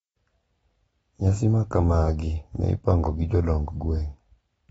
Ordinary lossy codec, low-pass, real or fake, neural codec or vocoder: AAC, 24 kbps; 9.9 kHz; real; none